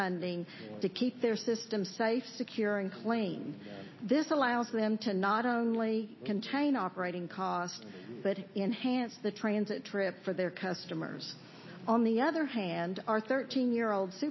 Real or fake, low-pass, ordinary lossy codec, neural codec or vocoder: real; 7.2 kHz; MP3, 24 kbps; none